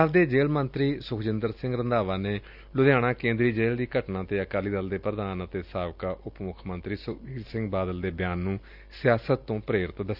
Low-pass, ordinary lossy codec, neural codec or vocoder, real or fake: 5.4 kHz; none; none; real